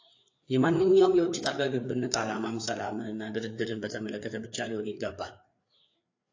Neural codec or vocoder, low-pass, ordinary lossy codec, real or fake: codec, 16 kHz, 4 kbps, FreqCodec, larger model; 7.2 kHz; AAC, 48 kbps; fake